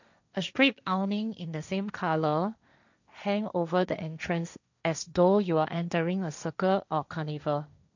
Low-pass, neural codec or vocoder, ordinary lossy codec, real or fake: 7.2 kHz; codec, 16 kHz, 1.1 kbps, Voila-Tokenizer; none; fake